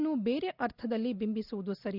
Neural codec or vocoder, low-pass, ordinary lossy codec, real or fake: none; 5.4 kHz; Opus, 64 kbps; real